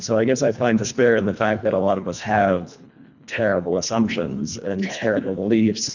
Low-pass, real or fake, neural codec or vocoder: 7.2 kHz; fake; codec, 24 kHz, 1.5 kbps, HILCodec